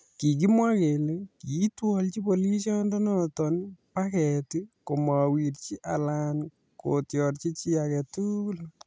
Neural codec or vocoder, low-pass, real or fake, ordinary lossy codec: none; none; real; none